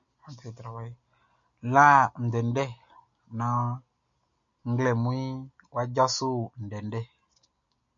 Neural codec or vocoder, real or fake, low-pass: none; real; 7.2 kHz